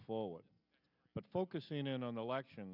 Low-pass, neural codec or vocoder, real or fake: 5.4 kHz; none; real